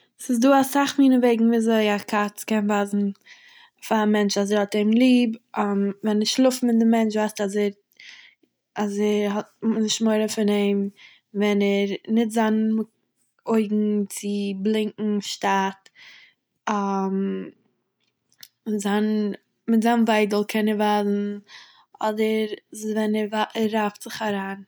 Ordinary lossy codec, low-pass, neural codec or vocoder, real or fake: none; none; none; real